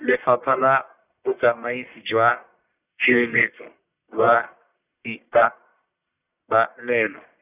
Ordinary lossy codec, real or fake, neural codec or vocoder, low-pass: none; fake; codec, 44.1 kHz, 1.7 kbps, Pupu-Codec; 3.6 kHz